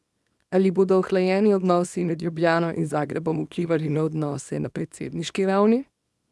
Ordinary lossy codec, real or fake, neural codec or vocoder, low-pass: none; fake; codec, 24 kHz, 0.9 kbps, WavTokenizer, small release; none